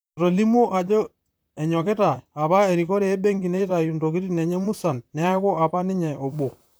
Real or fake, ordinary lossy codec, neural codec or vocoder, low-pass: fake; none; vocoder, 44.1 kHz, 128 mel bands, Pupu-Vocoder; none